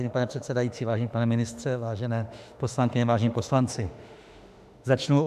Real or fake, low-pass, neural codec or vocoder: fake; 14.4 kHz; autoencoder, 48 kHz, 32 numbers a frame, DAC-VAE, trained on Japanese speech